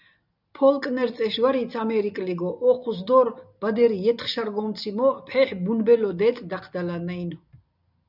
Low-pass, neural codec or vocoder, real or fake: 5.4 kHz; none; real